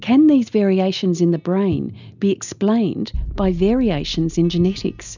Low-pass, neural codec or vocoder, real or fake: 7.2 kHz; none; real